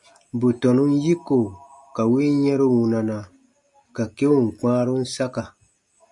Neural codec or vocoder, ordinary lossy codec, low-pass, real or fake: none; MP3, 96 kbps; 10.8 kHz; real